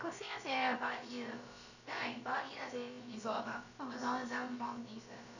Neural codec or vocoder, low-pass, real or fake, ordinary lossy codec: codec, 16 kHz, about 1 kbps, DyCAST, with the encoder's durations; 7.2 kHz; fake; none